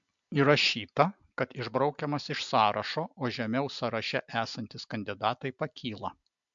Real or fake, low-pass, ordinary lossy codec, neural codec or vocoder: real; 7.2 kHz; MP3, 64 kbps; none